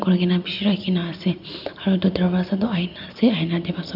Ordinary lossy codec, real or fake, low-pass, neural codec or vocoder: none; real; 5.4 kHz; none